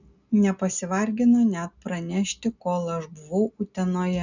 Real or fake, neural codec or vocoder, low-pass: real; none; 7.2 kHz